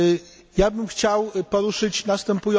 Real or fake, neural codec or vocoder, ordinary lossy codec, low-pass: real; none; none; none